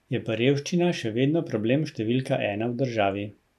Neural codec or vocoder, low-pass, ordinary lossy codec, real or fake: none; 14.4 kHz; none; real